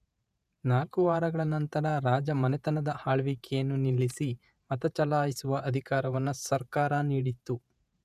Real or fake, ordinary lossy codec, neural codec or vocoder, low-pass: fake; none; vocoder, 48 kHz, 128 mel bands, Vocos; 14.4 kHz